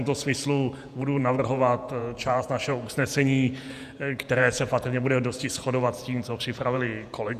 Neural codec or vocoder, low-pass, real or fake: vocoder, 48 kHz, 128 mel bands, Vocos; 14.4 kHz; fake